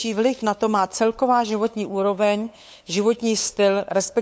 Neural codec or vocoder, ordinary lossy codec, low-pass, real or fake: codec, 16 kHz, 8 kbps, FunCodec, trained on LibriTTS, 25 frames a second; none; none; fake